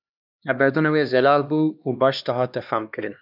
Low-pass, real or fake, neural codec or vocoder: 5.4 kHz; fake; codec, 16 kHz, 2 kbps, X-Codec, HuBERT features, trained on LibriSpeech